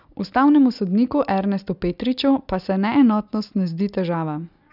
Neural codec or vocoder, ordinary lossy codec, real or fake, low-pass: none; none; real; 5.4 kHz